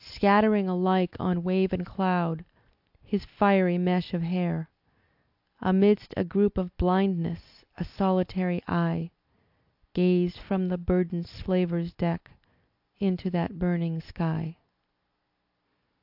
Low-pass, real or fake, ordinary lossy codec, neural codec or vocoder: 5.4 kHz; real; AAC, 48 kbps; none